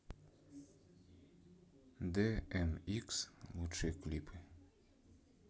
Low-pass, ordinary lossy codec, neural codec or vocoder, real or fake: none; none; none; real